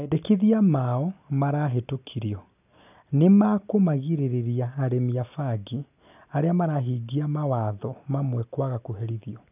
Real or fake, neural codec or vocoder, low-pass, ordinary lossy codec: real; none; 3.6 kHz; none